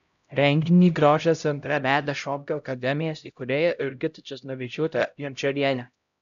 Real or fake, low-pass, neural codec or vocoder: fake; 7.2 kHz; codec, 16 kHz, 0.5 kbps, X-Codec, HuBERT features, trained on LibriSpeech